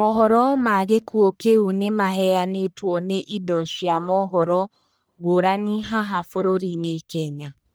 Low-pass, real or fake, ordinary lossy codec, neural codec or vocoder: none; fake; none; codec, 44.1 kHz, 1.7 kbps, Pupu-Codec